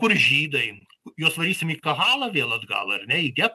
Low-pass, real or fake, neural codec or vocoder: 14.4 kHz; real; none